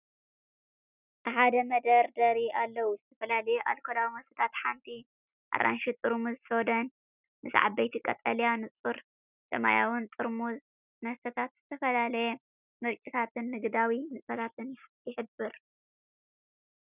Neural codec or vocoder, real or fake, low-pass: none; real; 3.6 kHz